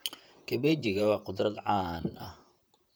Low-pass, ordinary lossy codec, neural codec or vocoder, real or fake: none; none; vocoder, 44.1 kHz, 128 mel bands, Pupu-Vocoder; fake